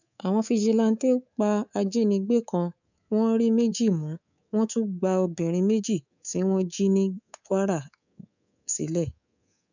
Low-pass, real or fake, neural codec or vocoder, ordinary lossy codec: 7.2 kHz; fake; codec, 24 kHz, 3.1 kbps, DualCodec; none